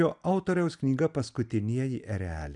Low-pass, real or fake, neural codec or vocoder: 10.8 kHz; real; none